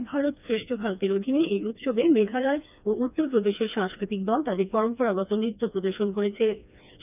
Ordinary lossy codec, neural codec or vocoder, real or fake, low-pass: none; codec, 16 kHz, 2 kbps, FreqCodec, smaller model; fake; 3.6 kHz